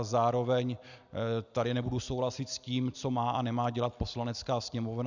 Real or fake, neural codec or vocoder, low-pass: real; none; 7.2 kHz